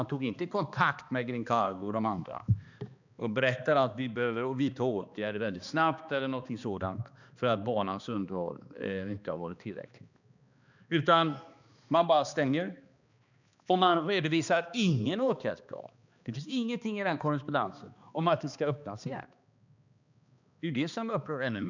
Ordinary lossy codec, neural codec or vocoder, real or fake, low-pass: none; codec, 16 kHz, 2 kbps, X-Codec, HuBERT features, trained on balanced general audio; fake; 7.2 kHz